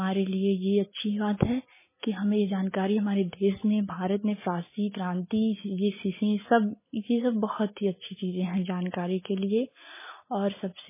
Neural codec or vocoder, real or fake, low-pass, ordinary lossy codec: none; real; 3.6 kHz; MP3, 16 kbps